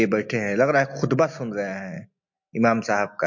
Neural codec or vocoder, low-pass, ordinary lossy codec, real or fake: none; 7.2 kHz; MP3, 48 kbps; real